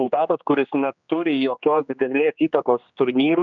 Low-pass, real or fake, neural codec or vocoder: 7.2 kHz; fake; codec, 16 kHz, 2 kbps, X-Codec, HuBERT features, trained on general audio